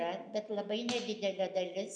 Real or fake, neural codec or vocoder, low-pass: real; none; 9.9 kHz